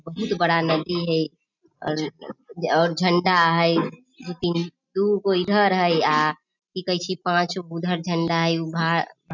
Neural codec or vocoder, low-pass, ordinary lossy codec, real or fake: none; 7.2 kHz; none; real